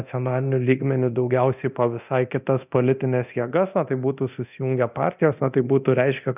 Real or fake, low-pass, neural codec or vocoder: fake; 3.6 kHz; codec, 24 kHz, 0.9 kbps, DualCodec